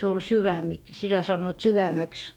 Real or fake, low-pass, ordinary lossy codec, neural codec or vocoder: fake; 19.8 kHz; none; codec, 44.1 kHz, 2.6 kbps, DAC